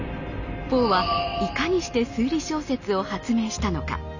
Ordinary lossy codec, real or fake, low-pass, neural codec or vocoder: none; real; 7.2 kHz; none